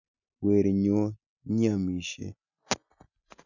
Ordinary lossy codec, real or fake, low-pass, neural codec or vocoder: none; real; 7.2 kHz; none